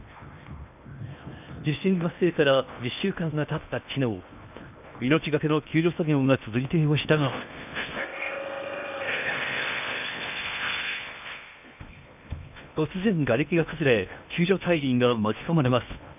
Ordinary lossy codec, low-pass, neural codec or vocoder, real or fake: none; 3.6 kHz; codec, 16 kHz in and 24 kHz out, 0.8 kbps, FocalCodec, streaming, 65536 codes; fake